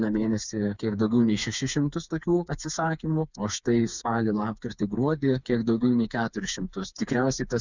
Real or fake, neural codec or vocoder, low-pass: fake; codec, 16 kHz, 4 kbps, FreqCodec, smaller model; 7.2 kHz